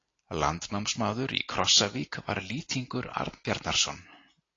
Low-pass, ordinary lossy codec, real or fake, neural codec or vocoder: 7.2 kHz; AAC, 32 kbps; real; none